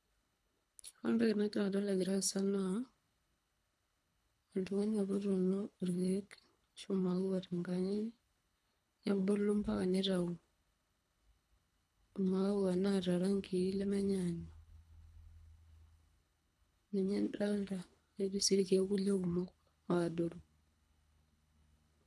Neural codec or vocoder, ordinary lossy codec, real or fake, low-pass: codec, 24 kHz, 3 kbps, HILCodec; none; fake; none